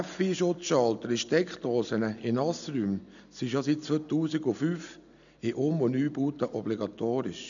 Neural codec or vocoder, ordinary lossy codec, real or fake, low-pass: none; none; real; 7.2 kHz